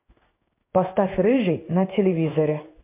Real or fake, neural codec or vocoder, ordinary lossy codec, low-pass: fake; codec, 16 kHz in and 24 kHz out, 1 kbps, XY-Tokenizer; MP3, 32 kbps; 3.6 kHz